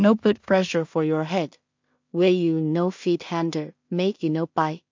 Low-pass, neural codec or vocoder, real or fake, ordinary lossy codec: 7.2 kHz; codec, 16 kHz in and 24 kHz out, 0.4 kbps, LongCat-Audio-Codec, two codebook decoder; fake; MP3, 48 kbps